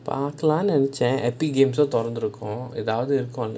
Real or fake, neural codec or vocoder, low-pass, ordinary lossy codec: real; none; none; none